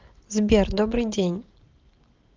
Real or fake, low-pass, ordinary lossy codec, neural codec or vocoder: real; 7.2 kHz; Opus, 16 kbps; none